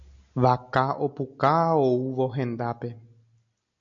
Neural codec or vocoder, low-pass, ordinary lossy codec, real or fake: none; 7.2 kHz; AAC, 64 kbps; real